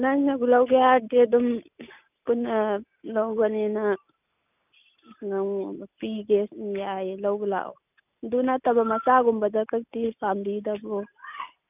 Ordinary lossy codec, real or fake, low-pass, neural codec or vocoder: none; real; 3.6 kHz; none